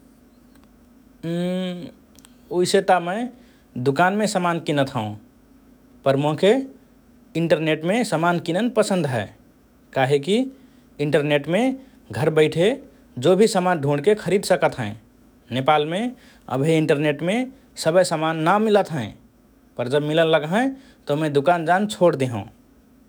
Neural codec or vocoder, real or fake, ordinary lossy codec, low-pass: autoencoder, 48 kHz, 128 numbers a frame, DAC-VAE, trained on Japanese speech; fake; none; none